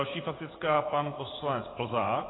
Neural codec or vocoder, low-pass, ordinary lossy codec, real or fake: vocoder, 44.1 kHz, 80 mel bands, Vocos; 7.2 kHz; AAC, 16 kbps; fake